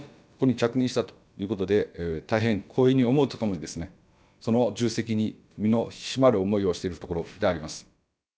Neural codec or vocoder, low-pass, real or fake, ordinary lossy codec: codec, 16 kHz, about 1 kbps, DyCAST, with the encoder's durations; none; fake; none